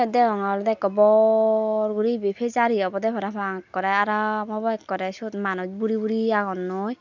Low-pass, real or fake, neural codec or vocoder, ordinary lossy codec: 7.2 kHz; real; none; none